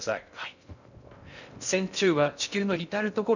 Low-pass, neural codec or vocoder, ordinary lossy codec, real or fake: 7.2 kHz; codec, 16 kHz in and 24 kHz out, 0.6 kbps, FocalCodec, streaming, 2048 codes; none; fake